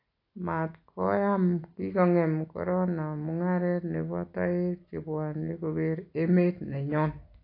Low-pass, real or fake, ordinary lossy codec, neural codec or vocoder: 5.4 kHz; real; AAC, 32 kbps; none